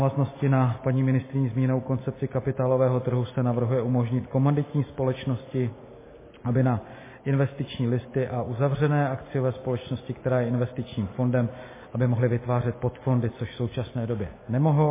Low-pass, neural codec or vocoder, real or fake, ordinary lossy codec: 3.6 kHz; none; real; MP3, 16 kbps